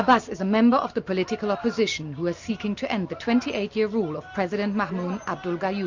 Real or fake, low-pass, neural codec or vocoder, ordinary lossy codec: real; 7.2 kHz; none; Opus, 64 kbps